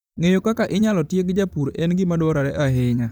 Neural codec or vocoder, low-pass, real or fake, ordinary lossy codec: vocoder, 44.1 kHz, 128 mel bands, Pupu-Vocoder; none; fake; none